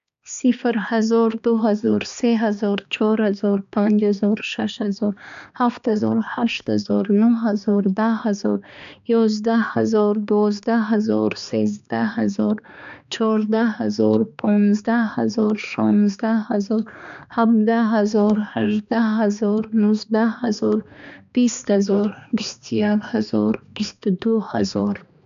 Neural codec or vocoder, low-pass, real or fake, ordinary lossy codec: codec, 16 kHz, 2 kbps, X-Codec, HuBERT features, trained on balanced general audio; 7.2 kHz; fake; AAC, 96 kbps